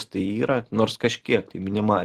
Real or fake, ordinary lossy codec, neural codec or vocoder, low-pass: real; Opus, 16 kbps; none; 14.4 kHz